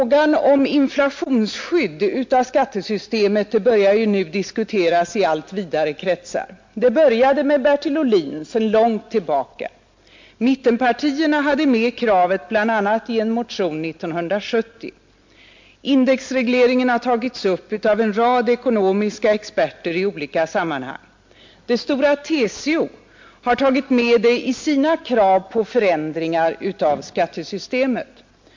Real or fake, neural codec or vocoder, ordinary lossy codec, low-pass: real; none; MP3, 48 kbps; 7.2 kHz